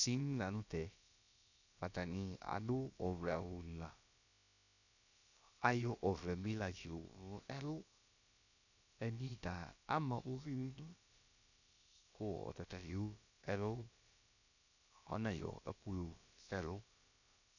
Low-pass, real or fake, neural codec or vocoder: 7.2 kHz; fake; codec, 16 kHz, about 1 kbps, DyCAST, with the encoder's durations